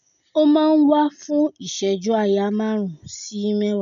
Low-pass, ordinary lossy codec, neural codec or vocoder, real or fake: 7.2 kHz; none; none; real